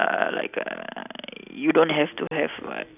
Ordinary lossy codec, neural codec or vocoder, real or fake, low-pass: none; none; real; 3.6 kHz